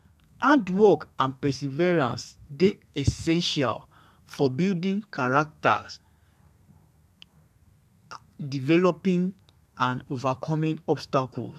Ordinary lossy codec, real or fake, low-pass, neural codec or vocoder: none; fake; 14.4 kHz; codec, 32 kHz, 1.9 kbps, SNAC